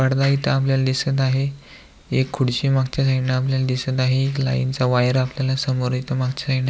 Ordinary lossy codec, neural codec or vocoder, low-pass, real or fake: none; none; none; real